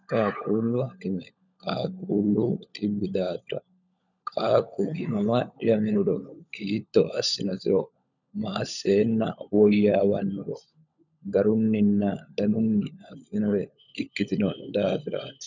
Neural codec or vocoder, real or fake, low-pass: codec, 16 kHz, 16 kbps, FunCodec, trained on LibriTTS, 50 frames a second; fake; 7.2 kHz